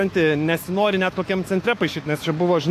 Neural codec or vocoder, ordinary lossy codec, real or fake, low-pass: autoencoder, 48 kHz, 128 numbers a frame, DAC-VAE, trained on Japanese speech; AAC, 64 kbps; fake; 14.4 kHz